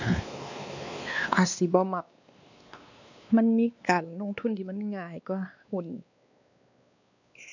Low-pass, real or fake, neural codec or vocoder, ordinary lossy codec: 7.2 kHz; fake; codec, 16 kHz, 2 kbps, X-Codec, WavLM features, trained on Multilingual LibriSpeech; none